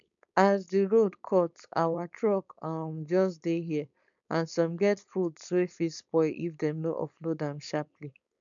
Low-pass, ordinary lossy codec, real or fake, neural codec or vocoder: 7.2 kHz; none; fake; codec, 16 kHz, 4.8 kbps, FACodec